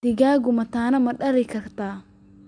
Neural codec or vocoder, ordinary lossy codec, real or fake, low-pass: none; AAC, 64 kbps; real; 9.9 kHz